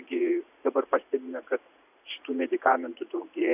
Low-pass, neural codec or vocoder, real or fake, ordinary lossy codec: 3.6 kHz; vocoder, 44.1 kHz, 80 mel bands, Vocos; fake; AAC, 32 kbps